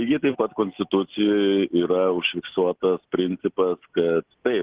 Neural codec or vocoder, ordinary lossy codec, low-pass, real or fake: none; Opus, 16 kbps; 3.6 kHz; real